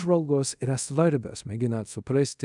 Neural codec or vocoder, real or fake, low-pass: codec, 24 kHz, 0.5 kbps, DualCodec; fake; 10.8 kHz